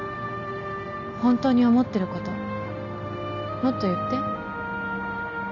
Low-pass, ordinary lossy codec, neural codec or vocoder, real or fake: 7.2 kHz; none; none; real